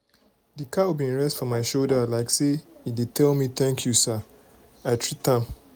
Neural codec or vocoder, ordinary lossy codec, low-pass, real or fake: none; none; none; real